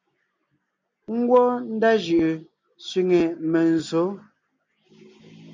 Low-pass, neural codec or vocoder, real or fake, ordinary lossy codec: 7.2 kHz; none; real; MP3, 64 kbps